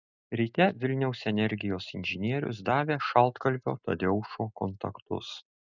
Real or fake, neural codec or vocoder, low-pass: real; none; 7.2 kHz